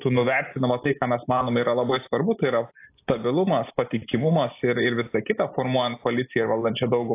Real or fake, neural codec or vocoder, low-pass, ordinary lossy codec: real; none; 3.6 kHz; AAC, 24 kbps